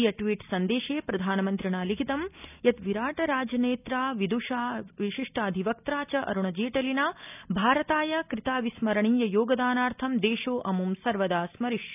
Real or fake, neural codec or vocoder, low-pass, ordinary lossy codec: real; none; 3.6 kHz; none